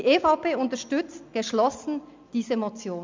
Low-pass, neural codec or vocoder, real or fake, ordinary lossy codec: 7.2 kHz; none; real; MP3, 64 kbps